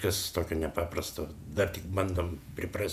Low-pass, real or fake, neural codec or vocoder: 14.4 kHz; fake; vocoder, 48 kHz, 128 mel bands, Vocos